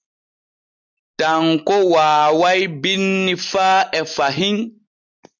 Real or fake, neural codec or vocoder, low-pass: real; none; 7.2 kHz